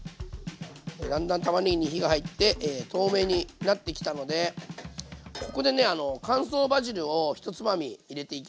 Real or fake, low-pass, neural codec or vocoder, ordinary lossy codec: real; none; none; none